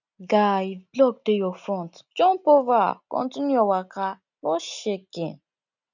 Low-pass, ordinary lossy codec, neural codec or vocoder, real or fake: 7.2 kHz; none; none; real